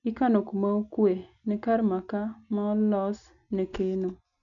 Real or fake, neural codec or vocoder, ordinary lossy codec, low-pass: real; none; none; 7.2 kHz